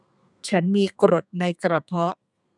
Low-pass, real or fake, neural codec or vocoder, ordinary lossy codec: 10.8 kHz; fake; codec, 32 kHz, 1.9 kbps, SNAC; none